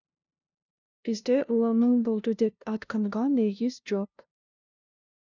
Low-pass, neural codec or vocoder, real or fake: 7.2 kHz; codec, 16 kHz, 0.5 kbps, FunCodec, trained on LibriTTS, 25 frames a second; fake